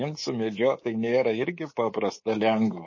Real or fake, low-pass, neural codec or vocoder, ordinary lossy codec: fake; 7.2 kHz; codec, 24 kHz, 3.1 kbps, DualCodec; MP3, 32 kbps